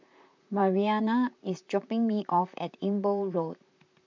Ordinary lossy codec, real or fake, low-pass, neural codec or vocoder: MP3, 64 kbps; fake; 7.2 kHz; vocoder, 44.1 kHz, 128 mel bands, Pupu-Vocoder